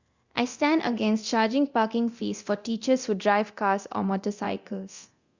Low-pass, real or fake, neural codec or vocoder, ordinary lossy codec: 7.2 kHz; fake; codec, 24 kHz, 0.9 kbps, DualCodec; Opus, 64 kbps